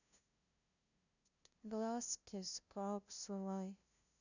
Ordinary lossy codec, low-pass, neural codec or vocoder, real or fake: none; 7.2 kHz; codec, 16 kHz, 0.5 kbps, FunCodec, trained on LibriTTS, 25 frames a second; fake